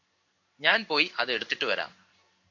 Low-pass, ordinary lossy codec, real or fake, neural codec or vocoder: 7.2 kHz; MP3, 64 kbps; fake; codec, 16 kHz in and 24 kHz out, 1 kbps, XY-Tokenizer